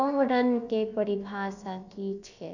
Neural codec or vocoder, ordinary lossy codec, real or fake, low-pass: codec, 16 kHz, about 1 kbps, DyCAST, with the encoder's durations; none; fake; 7.2 kHz